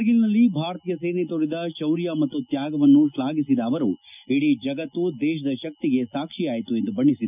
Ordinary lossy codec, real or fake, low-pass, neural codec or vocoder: none; real; 3.6 kHz; none